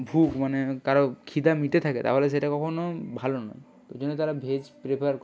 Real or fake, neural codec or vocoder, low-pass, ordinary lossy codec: real; none; none; none